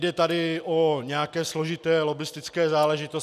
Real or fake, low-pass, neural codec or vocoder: real; 14.4 kHz; none